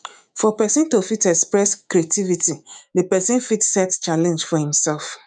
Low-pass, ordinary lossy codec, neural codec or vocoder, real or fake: 9.9 kHz; none; autoencoder, 48 kHz, 128 numbers a frame, DAC-VAE, trained on Japanese speech; fake